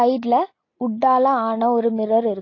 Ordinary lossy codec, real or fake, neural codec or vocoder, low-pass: AAC, 32 kbps; real; none; 7.2 kHz